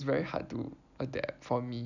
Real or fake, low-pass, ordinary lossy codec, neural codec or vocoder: real; 7.2 kHz; none; none